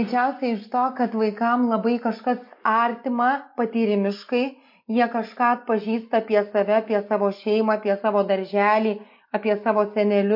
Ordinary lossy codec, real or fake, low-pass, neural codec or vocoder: MP3, 32 kbps; real; 5.4 kHz; none